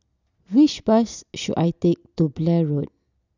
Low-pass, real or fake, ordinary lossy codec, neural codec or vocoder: 7.2 kHz; real; none; none